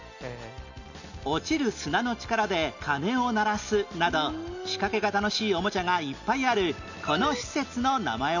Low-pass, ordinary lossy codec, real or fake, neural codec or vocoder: 7.2 kHz; none; real; none